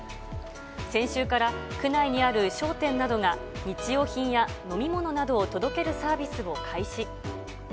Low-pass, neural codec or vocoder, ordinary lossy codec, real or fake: none; none; none; real